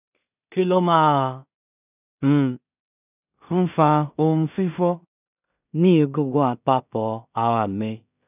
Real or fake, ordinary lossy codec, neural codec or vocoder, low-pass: fake; none; codec, 16 kHz in and 24 kHz out, 0.4 kbps, LongCat-Audio-Codec, two codebook decoder; 3.6 kHz